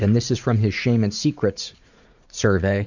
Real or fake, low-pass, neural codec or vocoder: fake; 7.2 kHz; vocoder, 44.1 kHz, 80 mel bands, Vocos